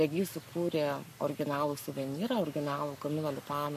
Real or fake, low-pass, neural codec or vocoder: fake; 14.4 kHz; codec, 44.1 kHz, 7.8 kbps, Pupu-Codec